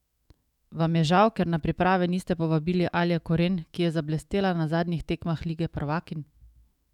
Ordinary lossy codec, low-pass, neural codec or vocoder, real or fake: none; 19.8 kHz; autoencoder, 48 kHz, 128 numbers a frame, DAC-VAE, trained on Japanese speech; fake